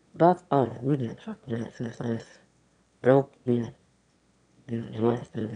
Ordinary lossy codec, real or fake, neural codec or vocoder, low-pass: none; fake; autoencoder, 22.05 kHz, a latent of 192 numbers a frame, VITS, trained on one speaker; 9.9 kHz